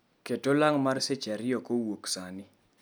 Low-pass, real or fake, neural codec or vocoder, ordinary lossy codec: none; real; none; none